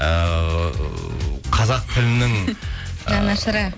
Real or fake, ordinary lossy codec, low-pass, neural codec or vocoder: real; none; none; none